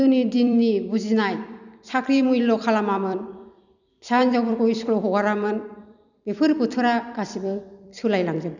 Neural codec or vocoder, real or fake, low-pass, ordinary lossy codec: vocoder, 44.1 kHz, 128 mel bands every 256 samples, BigVGAN v2; fake; 7.2 kHz; none